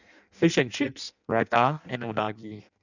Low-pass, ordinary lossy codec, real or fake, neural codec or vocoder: 7.2 kHz; none; fake; codec, 16 kHz in and 24 kHz out, 0.6 kbps, FireRedTTS-2 codec